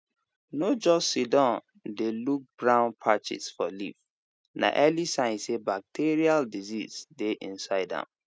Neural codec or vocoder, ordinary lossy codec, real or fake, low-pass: none; none; real; none